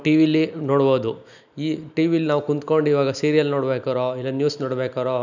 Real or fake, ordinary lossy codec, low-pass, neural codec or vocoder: real; none; 7.2 kHz; none